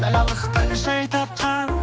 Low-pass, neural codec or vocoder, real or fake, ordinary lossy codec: none; codec, 16 kHz, 2 kbps, X-Codec, HuBERT features, trained on general audio; fake; none